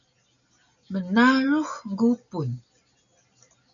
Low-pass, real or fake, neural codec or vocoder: 7.2 kHz; real; none